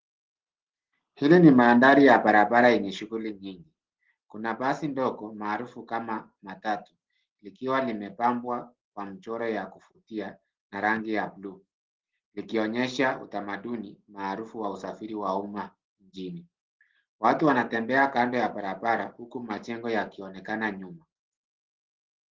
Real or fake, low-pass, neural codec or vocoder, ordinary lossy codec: real; 7.2 kHz; none; Opus, 16 kbps